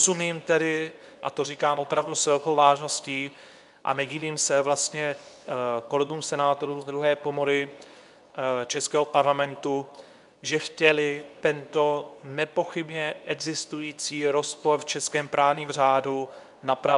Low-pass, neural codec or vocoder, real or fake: 10.8 kHz; codec, 24 kHz, 0.9 kbps, WavTokenizer, medium speech release version 2; fake